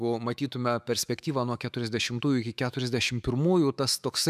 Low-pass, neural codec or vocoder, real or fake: 14.4 kHz; autoencoder, 48 kHz, 128 numbers a frame, DAC-VAE, trained on Japanese speech; fake